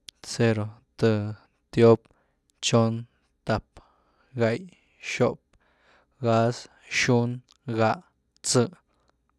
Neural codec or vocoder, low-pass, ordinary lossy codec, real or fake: none; none; none; real